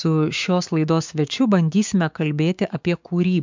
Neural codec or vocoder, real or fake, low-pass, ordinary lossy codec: codec, 16 kHz, 6 kbps, DAC; fake; 7.2 kHz; MP3, 64 kbps